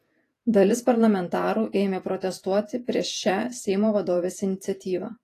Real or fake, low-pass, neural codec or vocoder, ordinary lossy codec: fake; 14.4 kHz; vocoder, 48 kHz, 128 mel bands, Vocos; AAC, 48 kbps